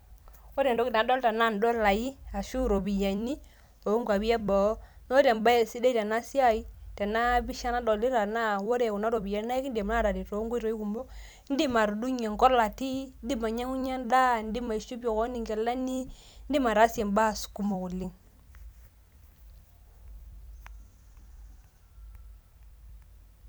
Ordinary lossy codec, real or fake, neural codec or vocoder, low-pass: none; fake; vocoder, 44.1 kHz, 128 mel bands every 256 samples, BigVGAN v2; none